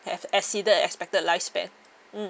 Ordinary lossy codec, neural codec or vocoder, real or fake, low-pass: none; none; real; none